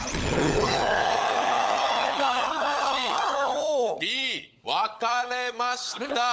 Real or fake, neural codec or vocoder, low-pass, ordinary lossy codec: fake; codec, 16 kHz, 16 kbps, FunCodec, trained on LibriTTS, 50 frames a second; none; none